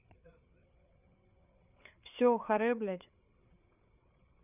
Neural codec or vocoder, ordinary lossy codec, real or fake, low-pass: codec, 16 kHz, 8 kbps, FreqCodec, larger model; none; fake; 3.6 kHz